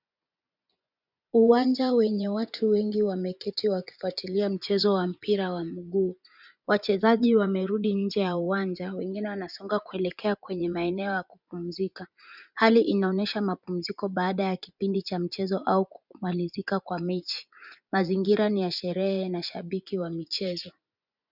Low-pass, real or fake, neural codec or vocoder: 5.4 kHz; fake; vocoder, 24 kHz, 100 mel bands, Vocos